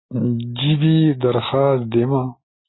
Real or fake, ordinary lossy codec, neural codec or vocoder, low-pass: real; AAC, 16 kbps; none; 7.2 kHz